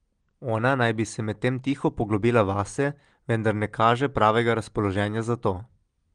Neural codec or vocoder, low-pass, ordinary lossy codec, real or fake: none; 9.9 kHz; Opus, 24 kbps; real